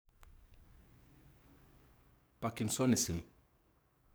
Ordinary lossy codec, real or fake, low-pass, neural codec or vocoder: none; fake; none; codec, 44.1 kHz, 7.8 kbps, DAC